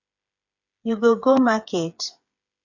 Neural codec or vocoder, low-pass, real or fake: codec, 16 kHz, 8 kbps, FreqCodec, smaller model; 7.2 kHz; fake